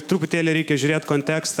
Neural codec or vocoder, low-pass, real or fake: autoencoder, 48 kHz, 128 numbers a frame, DAC-VAE, trained on Japanese speech; 19.8 kHz; fake